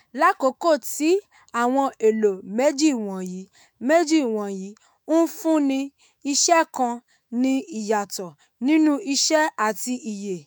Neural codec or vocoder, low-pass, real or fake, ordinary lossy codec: autoencoder, 48 kHz, 128 numbers a frame, DAC-VAE, trained on Japanese speech; none; fake; none